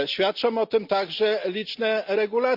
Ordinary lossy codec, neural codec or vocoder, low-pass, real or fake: Opus, 64 kbps; none; 5.4 kHz; real